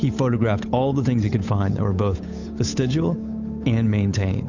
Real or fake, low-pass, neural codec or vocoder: fake; 7.2 kHz; codec, 16 kHz, 8 kbps, FunCodec, trained on Chinese and English, 25 frames a second